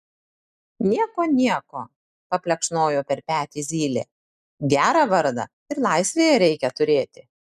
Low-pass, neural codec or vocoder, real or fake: 14.4 kHz; none; real